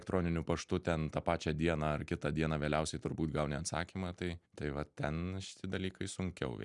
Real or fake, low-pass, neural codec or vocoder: real; 10.8 kHz; none